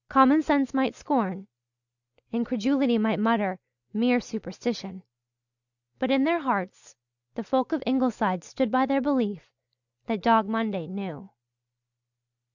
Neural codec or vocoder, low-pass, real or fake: none; 7.2 kHz; real